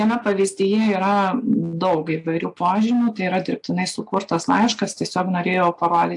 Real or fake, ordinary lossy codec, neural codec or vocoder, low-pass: real; AAC, 64 kbps; none; 10.8 kHz